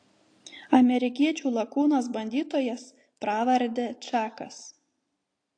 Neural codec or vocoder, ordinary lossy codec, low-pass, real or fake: none; AAC, 48 kbps; 9.9 kHz; real